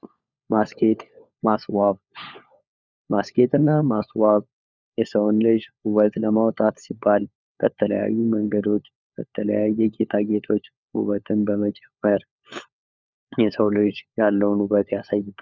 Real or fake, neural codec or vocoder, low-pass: fake; codec, 16 kHz, 4 kbps, FunCodec, trained on LibriTTS, 50 frames a second; 7.2 kHz